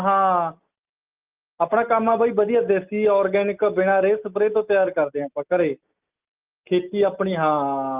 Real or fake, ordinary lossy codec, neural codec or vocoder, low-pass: real; Opus, 24 kbps; none; 3.6 kHz